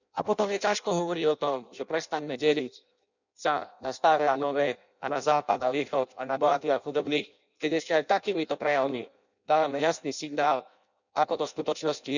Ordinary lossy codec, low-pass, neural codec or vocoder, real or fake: none; 7.2 kHz; codec, 16 kHz in and 24 kHz out, 0.6 kbps, FireRedTTS-2 codec; fake